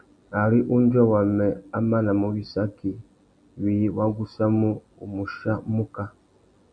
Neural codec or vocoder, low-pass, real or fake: none; 9.9 kHz; real